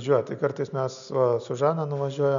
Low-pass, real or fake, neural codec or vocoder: 7.2 kHz; real; none